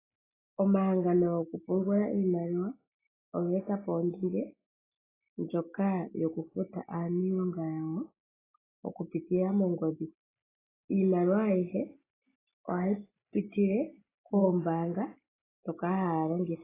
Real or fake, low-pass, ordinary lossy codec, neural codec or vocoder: real; 3.6 kHz; AAC, 16 kbps; none